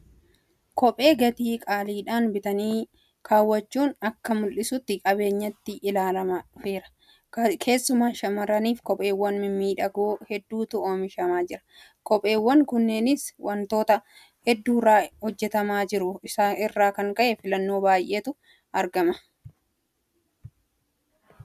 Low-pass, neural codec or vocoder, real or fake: 14.4 kHz; none; real